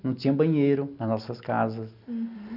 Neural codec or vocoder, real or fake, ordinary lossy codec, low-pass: none; real; none; 5.4 kHz